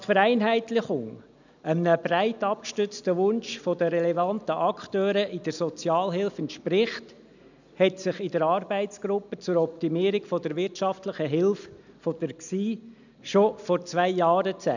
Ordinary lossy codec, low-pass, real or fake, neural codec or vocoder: none; 7.2 kHz; real; none